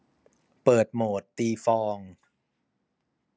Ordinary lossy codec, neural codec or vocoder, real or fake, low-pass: none; none; real; none